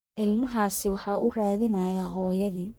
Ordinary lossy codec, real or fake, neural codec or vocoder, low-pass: none; fake; codec, 44.1 kHz, 1.7 kbps, Pupu-Codec; none